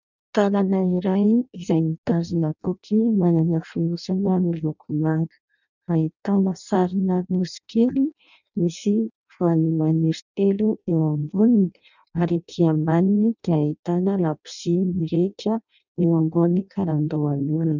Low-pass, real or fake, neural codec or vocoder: 7.2 kHz; fake; codec, 16 kHz in and 24 kHz out, 0.6 kbps, FireRedTTS-2 codec